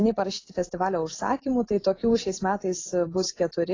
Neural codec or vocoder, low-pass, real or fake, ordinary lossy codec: none; 7.2 kHz; real; AAC, 32 kbps